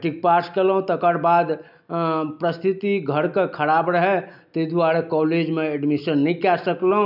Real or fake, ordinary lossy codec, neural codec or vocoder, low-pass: real; none; none; 5.4 kHz